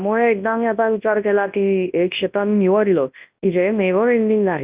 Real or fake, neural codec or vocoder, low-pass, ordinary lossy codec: fake; codec, 24 kHz, 0.9 kbps, WavTokenizer, large speech release; 3.6 kHz; Opus, 32 kbps